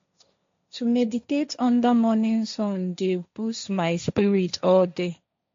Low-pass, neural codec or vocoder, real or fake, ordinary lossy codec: 7.2 kHz; codec, 16 kHz, 1.1 kbps, Voila-Tokenizer; fake; MP3, 48 kbps